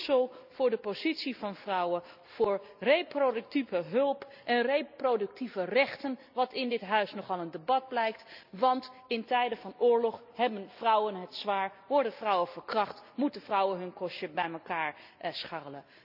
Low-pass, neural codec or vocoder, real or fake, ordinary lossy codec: 5.4 kHz; none; real; none